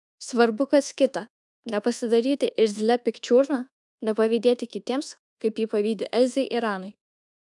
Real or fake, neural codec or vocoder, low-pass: fake; codec, 24 kHz, 1.2 kbps, DualCodec; 10.8 kHz